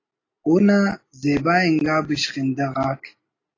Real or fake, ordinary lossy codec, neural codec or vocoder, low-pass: real; AAC, 32 kbps; none; 7.2 kHz